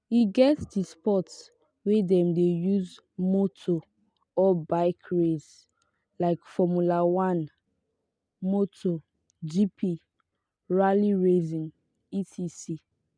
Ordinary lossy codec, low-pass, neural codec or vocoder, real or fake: none; 9.9 kHz; none; real